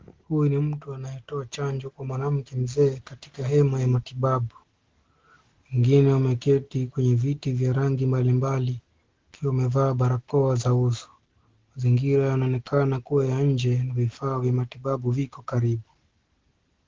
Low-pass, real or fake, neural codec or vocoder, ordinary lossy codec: 7.2 kHz; real; none; Opus, 16 kbps